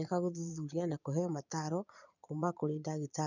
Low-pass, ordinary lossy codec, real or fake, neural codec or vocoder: 7.2 kHz; none; fake; vocoder, 22.05 kHz, 80 mel bands, Vocos